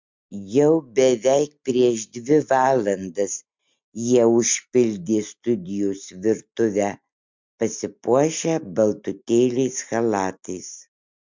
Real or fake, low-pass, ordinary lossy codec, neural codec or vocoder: real; 7.2 kHz; MP3, 64 kbps; none